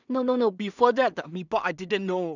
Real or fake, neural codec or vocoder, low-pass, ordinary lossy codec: fake; codec, 16 kHz in and 24 kHz out, 0.4 kbps, LongCat-Audio-Codec, two codebook decoder; 7.2 kHz; none